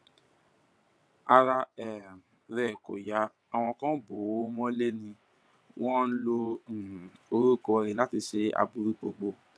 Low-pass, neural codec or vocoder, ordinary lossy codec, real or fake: none; vocoder, 22.05 kHz, 80 mel bands, WaveNeXt; none; fake